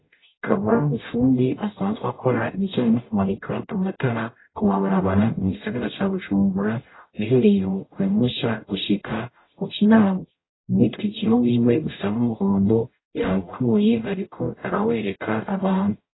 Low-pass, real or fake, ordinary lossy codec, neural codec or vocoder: 7.2 kHz; fake; AAC, 16 kbps; codec, 44.1 kHz, 0.9 kbps, DAC